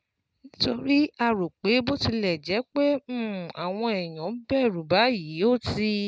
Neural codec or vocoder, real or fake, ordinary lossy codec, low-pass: none; real; none; none